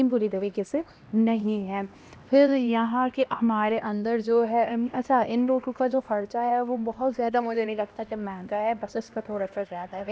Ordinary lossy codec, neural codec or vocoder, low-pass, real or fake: none; codec, 16 kHz, 1 kbps, X-Codec, HuBERT features, trained on LibriSpeech; none; fake